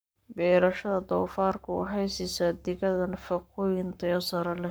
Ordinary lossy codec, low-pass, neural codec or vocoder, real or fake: none; none; codec, 44.1 kHz, 7.8 kbps, Pupu-Codec; fake